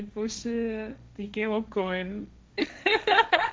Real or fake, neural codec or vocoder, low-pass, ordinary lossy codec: fake; codec, 16 kHz, 1.1 kbps, Voila-Tokenizer; none; none